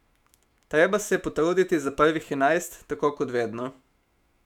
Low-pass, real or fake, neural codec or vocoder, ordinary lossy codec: 19.8 kHz; fake; autoencoder, 48 kHz, 128 numbers a frame, DAC-VAE, trained on Japanese speech; none